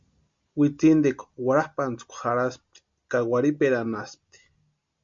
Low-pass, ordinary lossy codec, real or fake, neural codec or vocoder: 7.2 kHz; MP3, 48 kbps; real; none